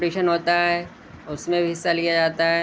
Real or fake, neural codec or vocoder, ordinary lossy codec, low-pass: real; none; none; none